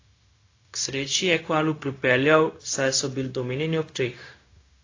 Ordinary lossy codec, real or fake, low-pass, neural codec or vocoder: AAC, 32 kbps; fake; 7.2 kHz; codec, 16 kHz, 0.4 kbps, LongCat-Audio-Codec